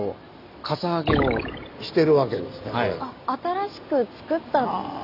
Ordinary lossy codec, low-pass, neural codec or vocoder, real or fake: none; 5.4 kHz; none; real